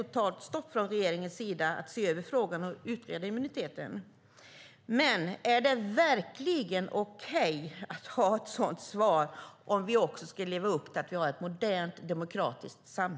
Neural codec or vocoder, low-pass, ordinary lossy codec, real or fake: none; none; none; real